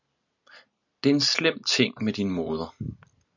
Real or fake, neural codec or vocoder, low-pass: real; none; 7.2 kHz